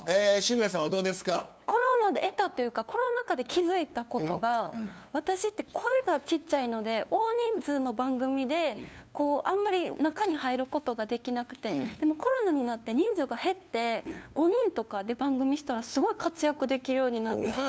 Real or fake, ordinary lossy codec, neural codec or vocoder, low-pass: fake; none; codec, 16 kHz, 2 kbps, FunCodec, trained on LibriTTS, 25 frames a second; none